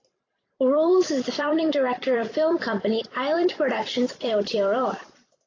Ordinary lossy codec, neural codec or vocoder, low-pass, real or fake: AAC, 32 kbps; vocoder, 44.1 kHz, 128 mel bands every 256 samples, BigVGAN v2; 7.2 kHz; fake